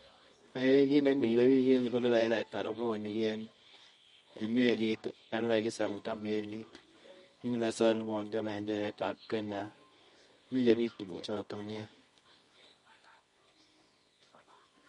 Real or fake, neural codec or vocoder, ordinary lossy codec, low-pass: fake; codec, 24 kHz, 0.9 kbps, WavTokenizer, medium music audio release; MP3, 48 kbps; 10.8 kHz